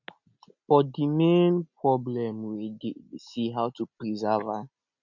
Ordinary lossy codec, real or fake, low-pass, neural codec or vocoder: none; real; 7.2 kHz; none